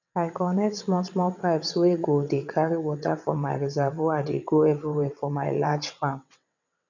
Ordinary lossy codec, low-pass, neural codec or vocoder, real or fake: none; 7.2 kHz; vocoder, 22.05 kHz, 80 mel bands, WaveNeXt; fake